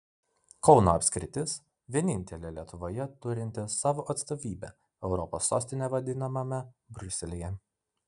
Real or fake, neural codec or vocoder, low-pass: real; none; 10.8 kHz